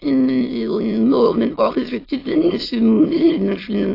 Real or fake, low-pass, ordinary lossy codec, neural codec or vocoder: fake; 5.4 kHz; AAC, 32 kbps; autoencoder, 22.05 kHz, a latent of 192 numbers a frame, VITS, trained on many speakers